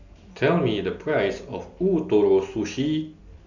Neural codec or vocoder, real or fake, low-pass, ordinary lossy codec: none; real; 7.2 kHz; none